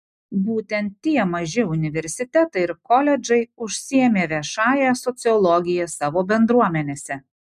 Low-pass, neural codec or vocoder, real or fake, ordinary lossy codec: 14.4 kHz; none; real; MP3, 96 kbps